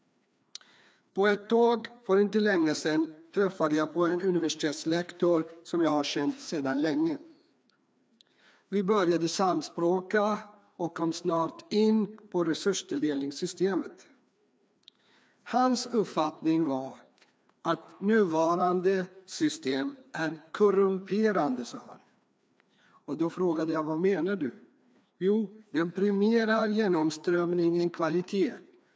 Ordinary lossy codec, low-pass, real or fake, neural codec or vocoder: none; none; fake; codec, 16 kHz, 2 kbps, FreqCodec, larger model